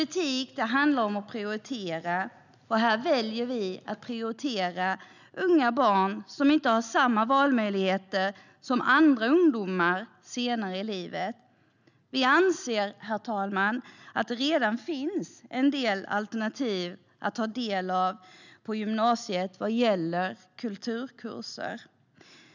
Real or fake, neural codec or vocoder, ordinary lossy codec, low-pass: real; none; none; 7.2 kHz